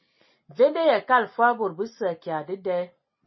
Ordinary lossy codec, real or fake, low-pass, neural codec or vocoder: MP3, 24 kbps; real; 7.2 kHz; none